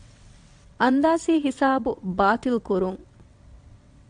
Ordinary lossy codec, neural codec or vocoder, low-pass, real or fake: AAC, 64 kbps; vocoder, 22.05 kHz, 80 mel bands, WaveNeXt; 9.9 kHz; fake